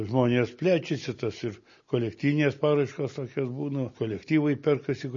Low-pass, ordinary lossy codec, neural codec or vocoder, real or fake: 7.2 kHz; MP3, 32 kbps; none; real